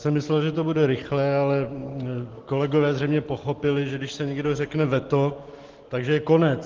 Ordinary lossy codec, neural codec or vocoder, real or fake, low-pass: Opus, 16 kbps; none; real; 7.2 kHz